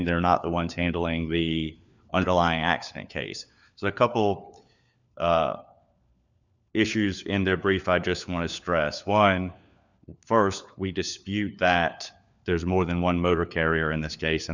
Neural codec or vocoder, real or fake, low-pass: codec, 16 kHz, 4 kbps, FunCodec, trained on LibriTTS, 50 frames a second; fake; 7.2 kHz